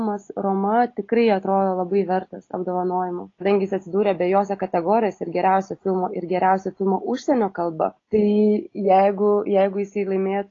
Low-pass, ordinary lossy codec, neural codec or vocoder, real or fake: 7.2 kHz; AAC, 32 kbps; none; real